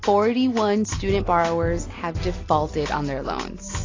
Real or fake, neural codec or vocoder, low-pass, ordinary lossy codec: real; none; 7.2 kHz; AAC, 32 kbps